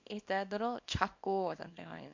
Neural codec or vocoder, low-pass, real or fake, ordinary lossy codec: codec, 24 kHz, 0.9 kbps, WavTokenizer, small release; 7.2 kHz; fake; MP3, 48 kbps